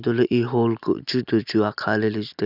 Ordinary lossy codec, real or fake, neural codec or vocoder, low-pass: none; real; none; 5.4 kHz